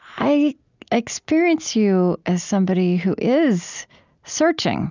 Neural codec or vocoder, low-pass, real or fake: none; 7.2 kHz; real